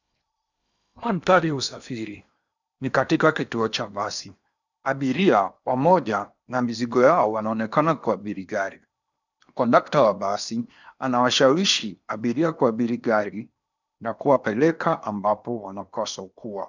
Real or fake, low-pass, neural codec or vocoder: fake; 7.2 kHz; codec, 16 kHz in and 24 kHz out, 0.8 kbps, FocalCodec, streaming, 65536 codes